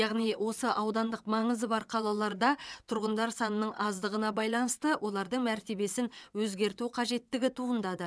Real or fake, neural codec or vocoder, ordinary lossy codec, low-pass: fake; vocoder, 22.05 kHz, 80 mel bands, WaveNeXt; none; none